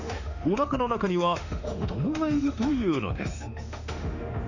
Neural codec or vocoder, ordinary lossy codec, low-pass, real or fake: autoencoder, 48 kHz, 32 numbers a frame, DAC-VAE, trained on Japanese speech; none; 7.2 kHz; fake